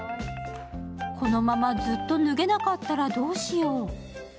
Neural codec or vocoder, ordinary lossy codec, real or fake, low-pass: none; none; real; none